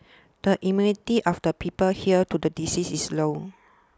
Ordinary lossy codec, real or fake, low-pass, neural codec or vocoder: none; real; none; none